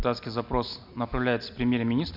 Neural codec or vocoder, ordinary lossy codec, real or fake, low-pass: none; none; real; 5.4 kHz